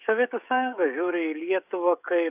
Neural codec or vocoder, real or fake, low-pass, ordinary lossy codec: codec, 16 kHz, 16 kbps, FreqCodec, smaller model; fake; 3.6 kHz; AAC, 32 kbps